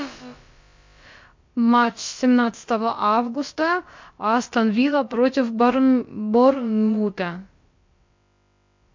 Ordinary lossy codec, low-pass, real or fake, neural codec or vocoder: MP3, 48 kbps; 7.2 kHz; fake; codec, 16 kHz, about 1 kbps, DyCAST, with the encoder's durations